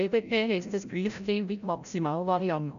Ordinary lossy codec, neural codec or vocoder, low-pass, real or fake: none; codec, 16 kHz, 0.5 kbps, FreqCodec, larger model; 7.2 kHz; fake